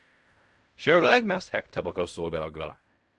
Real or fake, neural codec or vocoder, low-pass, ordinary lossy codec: fake; codec, 16 kHz in and 24 kHz out, 0.4 kbps, LongCat-Audio-Codec, fine tuned four codebook decoder; 10.8 kHz; MP3, 64 kbps